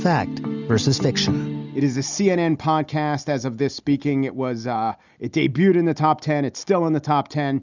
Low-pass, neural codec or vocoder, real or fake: 7.2 kHz; none; real